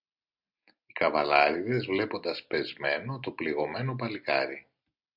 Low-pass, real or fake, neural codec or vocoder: 5.4 kHz; real; none